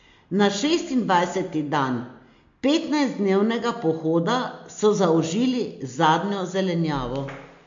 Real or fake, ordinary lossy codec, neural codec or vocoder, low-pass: real; MP3, 48 kbps; none; 7.2 kHz